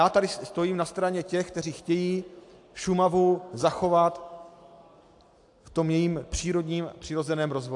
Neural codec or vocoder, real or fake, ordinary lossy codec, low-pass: none; real; AAC, 64 kbps; 10.8 kHz